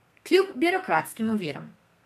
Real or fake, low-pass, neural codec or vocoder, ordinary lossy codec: fake; 14.4 kHz; codec, 32 kHz, 1.9 kbps, SNAC; none